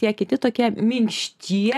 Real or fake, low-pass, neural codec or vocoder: real; 14.4 kHz; none